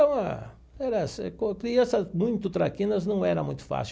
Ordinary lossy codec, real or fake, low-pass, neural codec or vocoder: none; real; none; none